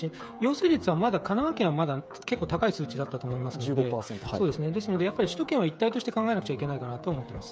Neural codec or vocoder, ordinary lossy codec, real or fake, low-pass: codec, 16 kHz, 8 kbps, FreqCodec, smaller model; none; fake; none